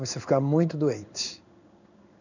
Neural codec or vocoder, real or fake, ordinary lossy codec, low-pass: codec, 16 kHz in and 24 kHz out, 1 kbps, XY-Tokenizer; fake; none; 7.2 kHz